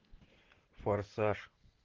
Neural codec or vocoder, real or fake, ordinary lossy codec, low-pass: none; real; Opus, 16 kbps; 7.2 kHz